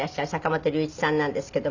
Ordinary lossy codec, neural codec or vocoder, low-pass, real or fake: none; none; 7.2 kHz; real